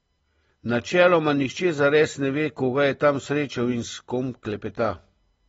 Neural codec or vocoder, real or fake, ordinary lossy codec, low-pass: none; real; AAC, 24 kbps; 10.8 kHz